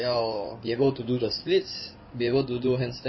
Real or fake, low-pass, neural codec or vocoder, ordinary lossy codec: fake; 7.2 kHz; codec, 16 kHz in and 24 kHz out, 2.2 kbps, FireRedTTS-2 codec; MP3, 24 kbps